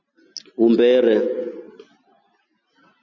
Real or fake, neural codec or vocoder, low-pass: real; none; 7.2 kHz